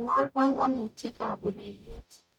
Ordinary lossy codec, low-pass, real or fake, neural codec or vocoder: none; 19.8 kHz; fake; codec, 44.1 kHz, 0.9 kbps, DAC